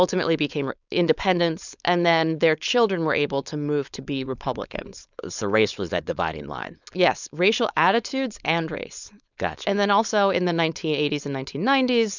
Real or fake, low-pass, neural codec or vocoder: fake; 7.2 kHz; codec, 16 kHz, 4.8 kbps, FACodec